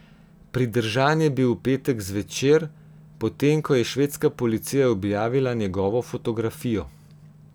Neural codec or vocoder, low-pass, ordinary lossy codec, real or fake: none; none; none; real